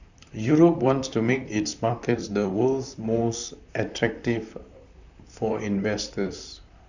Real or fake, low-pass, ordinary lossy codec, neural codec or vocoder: fake; 7.2 kHz; none; vocoder, 44.1 kHz, 128 mel bands, Pupu-Vocoder